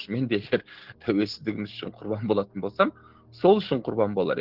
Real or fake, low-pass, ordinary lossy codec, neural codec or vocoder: real; 5.4 kHz; Opus, 16 kbps; none